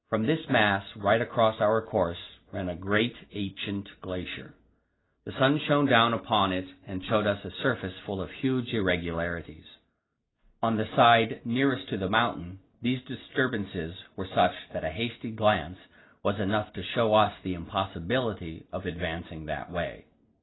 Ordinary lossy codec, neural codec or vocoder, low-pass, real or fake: AAC, 16 kbps; none; 7.2 kHz; real